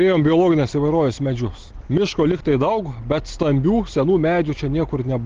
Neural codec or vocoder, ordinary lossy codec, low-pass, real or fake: none; Opus, 16 kbps; 7.2 kHz; real